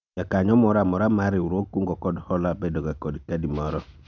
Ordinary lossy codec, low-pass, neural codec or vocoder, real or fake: none; 7.2 kHz; none; real